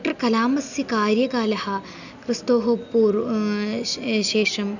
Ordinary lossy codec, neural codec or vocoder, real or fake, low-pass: none; none; real; 7.2 kHz